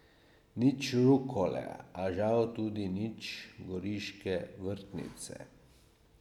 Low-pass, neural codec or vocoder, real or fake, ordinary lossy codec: 19.8 kHz; none; real; none